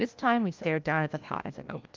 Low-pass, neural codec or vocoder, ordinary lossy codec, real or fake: 7.2 kHz; codec, 16 kHz, 1 kbps, FunCodec, trained on LibriTTS, 50 frames a second; Opus, 32 kbps; fake